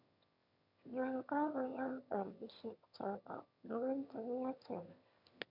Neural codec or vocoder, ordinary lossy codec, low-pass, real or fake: autoencoder, 22.05 kHz, a latent of 192 numbers a frame, VITS, trained on one speaker; none; 5.4 kHz; fake